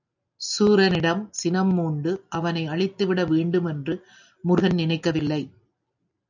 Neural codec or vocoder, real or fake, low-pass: none; real; 7.2 kHz